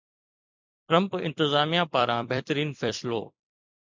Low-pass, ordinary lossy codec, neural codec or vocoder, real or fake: 7.2 kHz; MP3, 64 kbps; vocoder, 44.1 kHz, 128 mel bands every 256 samples, BigVGAN v2; fake